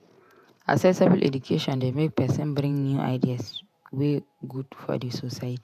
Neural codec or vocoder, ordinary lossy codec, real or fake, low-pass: vocoder, 48 kHz, 128 mel bands, Vocos; AAC, 96 kbps; fake; 14.4 kHz